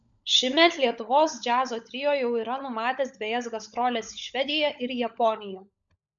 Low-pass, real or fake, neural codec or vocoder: 7.2 kHz; fake; codec, 16 kHz, 16 kbps, FunCodec, trained on LibriTTS, 50 frames a second